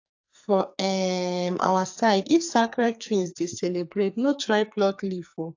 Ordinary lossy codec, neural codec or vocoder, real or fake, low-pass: AAC, 48 kbps; codec, 44.1 kHz, 2.6 kbps, SNAC; fake; 7.2 kHz